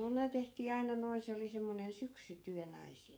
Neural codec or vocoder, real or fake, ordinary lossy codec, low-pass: codec, 44.1 kHz, 7.8 kbps, DAC; fake; none; none